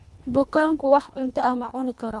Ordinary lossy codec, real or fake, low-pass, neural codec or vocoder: none; fake; none; codec, 24 kHz, 1.5 kbps, HILCodec